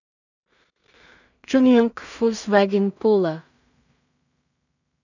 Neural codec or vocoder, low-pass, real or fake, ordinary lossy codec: codec, 16 kHz in and 24 kHz out, 0.4 kbps, LongCat-Audio-Codec, two codebook decoder; 7.2 kHz; fake; none